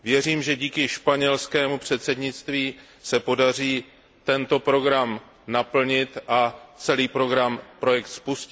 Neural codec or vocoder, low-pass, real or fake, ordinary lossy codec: none; none; real; none